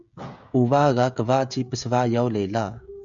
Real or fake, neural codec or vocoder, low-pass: fake; codec, 16 kHz, 16 kbps, FreqCodec, smaller model; 7.2 kHz